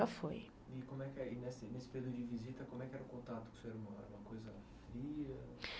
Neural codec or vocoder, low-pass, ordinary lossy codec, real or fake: none; none; none; real